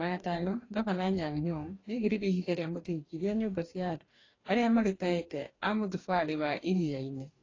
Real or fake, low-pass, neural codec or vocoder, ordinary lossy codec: fake; 7.2 kHz; codec, 44.1 kHz, 2.6 kbps, DAC; AAC, 32 kbps